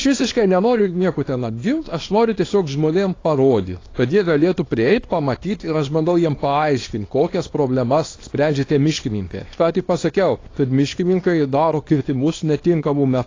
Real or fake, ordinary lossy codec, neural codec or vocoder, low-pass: fake; AAC, 32 kbps; codec, 24 kHz, 0.9 kbps, WavTokenizer, small release; 7.2 kHz